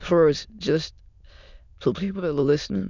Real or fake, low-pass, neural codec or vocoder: fake; 7.2 kHz; autoencoder, 22.05 kHz, a latent of 192 numbers a frame, VITS, trained on many speakers